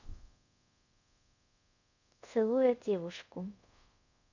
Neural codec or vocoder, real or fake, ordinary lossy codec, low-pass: codec, 24 kHz, 0.5 kbps, DualCodec; fake; none; 7.2 kHz